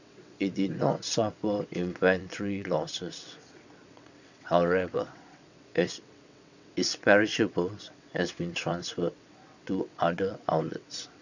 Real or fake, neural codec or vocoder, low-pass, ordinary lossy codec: fake; vocoder, 22.05 kHz, 80 mel bands, WaveNeXt; 7.2 kHz; none